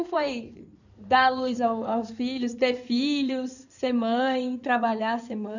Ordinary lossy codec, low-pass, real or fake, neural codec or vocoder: none; 7.2 kHz; fake; codec, 16 kHz in and 24 kHz out, 2.2 kbps, FireRedTTS-2 codec